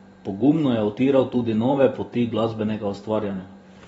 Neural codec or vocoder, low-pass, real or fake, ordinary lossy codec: vocoder, 44.1 kHz, 128 mel bands every 512 samples, BigVGAN v2; 19.8 kHz; fake; AAC, 24 kbps